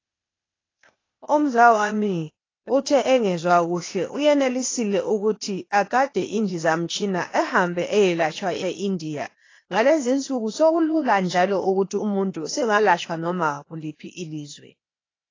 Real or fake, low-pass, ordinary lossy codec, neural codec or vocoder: fake; 7.2 kHz; AAC, 32 kbps; codec, 16 kHz, 0.8 kbps, ZipCodec